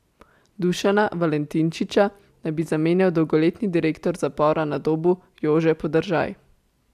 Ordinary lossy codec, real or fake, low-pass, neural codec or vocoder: AAC, 96 kbps; real; 14.4 kHz; none